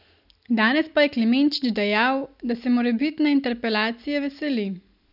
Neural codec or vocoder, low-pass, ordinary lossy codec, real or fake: none; 5.4 kHz; none; real